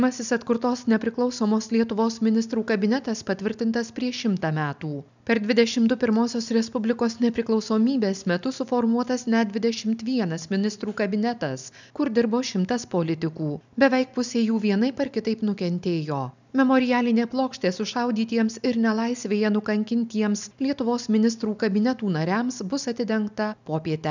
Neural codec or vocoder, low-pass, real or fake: none; 7.2 kHz; real